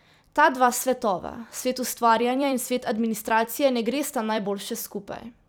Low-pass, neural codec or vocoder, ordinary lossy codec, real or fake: none; vocoder, 44.1 kHz, 128 mel bands every 256 samples, BigVGAN v2; none; fake